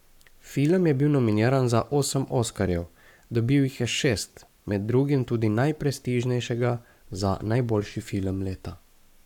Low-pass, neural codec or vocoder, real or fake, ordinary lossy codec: 19.8 kHz; codec, 44.1 kHz, 7.8 kbps, Pupu-Codec; fake; none